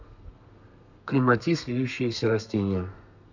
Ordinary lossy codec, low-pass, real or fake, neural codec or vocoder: none; 7.2 kHz; fake; codec, 32 kHz, 1.9 kbps, SNAC